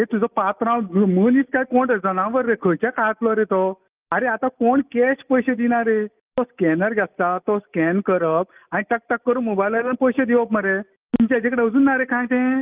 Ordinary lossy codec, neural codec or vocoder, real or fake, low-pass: Opus, 24 kbps; none; real; 3.6 kHz